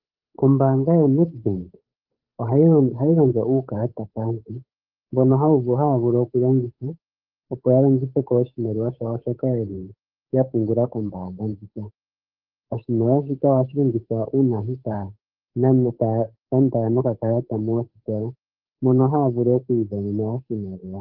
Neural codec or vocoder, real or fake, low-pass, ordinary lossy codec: codec, 16 kHz, 8 kbps, FunCodec, trained on Chinese and English, 25 frames a second; fake; 5.4 kHz; Opus, 24 kbps